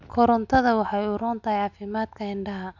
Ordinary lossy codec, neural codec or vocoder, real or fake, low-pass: none; none; real; 7.2 kHz